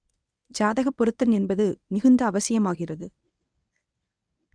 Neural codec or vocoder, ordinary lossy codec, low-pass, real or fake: codec, 24 kHz, 0.9 kbps, WavTokenizer, medium speech release version 1; none; 9.9 kHz; fake